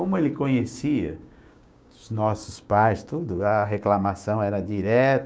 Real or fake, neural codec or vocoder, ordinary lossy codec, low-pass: fake; codec, 16 kHz, 6 kbps, DAC; none; none